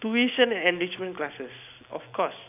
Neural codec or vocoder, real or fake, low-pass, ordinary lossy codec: none; real; 3.6 kHz; none